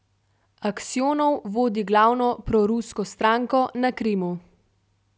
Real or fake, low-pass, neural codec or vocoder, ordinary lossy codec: real; none; none; none